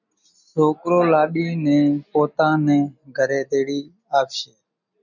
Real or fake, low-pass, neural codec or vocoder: real; 7.2 kHz; none